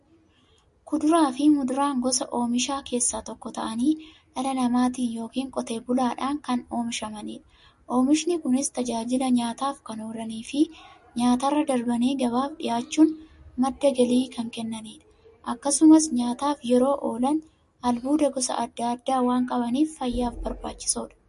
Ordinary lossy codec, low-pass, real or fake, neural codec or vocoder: MP3, 48 kbps; 14.4 kHz; real; none